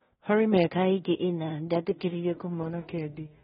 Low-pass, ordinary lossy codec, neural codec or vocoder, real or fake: 10.8 kHz; AAC, 16 kbps; codec, 16 kHz in and 24 kHz out, 0.4 kbps, LongCat-Audio-Codec, two codebook decoder; fake